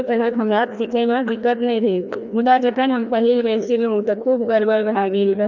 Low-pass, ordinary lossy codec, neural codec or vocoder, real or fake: 7.2 kHz; none; codec, 16 kHz, 1 kbps, FreqCodec, larger model; fake